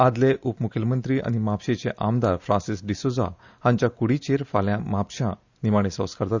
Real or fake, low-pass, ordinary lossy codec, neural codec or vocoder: real; 7.2 kHz; Opus, 64 kbps; none